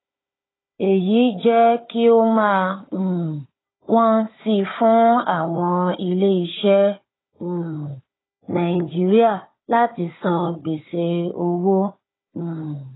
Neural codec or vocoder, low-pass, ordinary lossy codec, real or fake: codec, 16 kHz, 4 kbps, FunCodec, trained on Chinese and English, 50 frames a second; 7.2 kHz; AAC, 16 kbps; fake